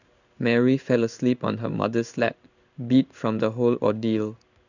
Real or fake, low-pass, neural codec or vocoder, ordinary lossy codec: fake; 7.2 kHz; codec, 16 kHz in and 24 kHz out, 1 kbps, XY-Tokenizer; none